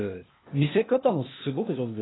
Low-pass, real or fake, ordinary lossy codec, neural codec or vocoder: 7.2 kHz; fake; AAC, 16 kbps; codec, 16 kHz, 0.8 kbps, ZipCodec